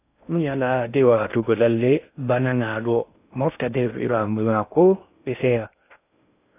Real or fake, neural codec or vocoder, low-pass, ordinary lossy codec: fake; codec, 16 kHz in and 24 kHz out, 0.6 kbps, FocalCodec, streaming, 2048 codes; 3.6 kHz; AAC, 24 kbps